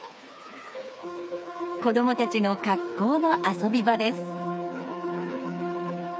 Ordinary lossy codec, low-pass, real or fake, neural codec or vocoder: none; none; fake; codec, 16 kHz, 4 kbps, FreqCodec, smaller model